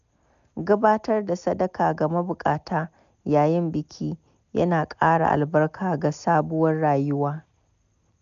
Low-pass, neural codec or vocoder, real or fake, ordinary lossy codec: 7.2 kHz; none; real; none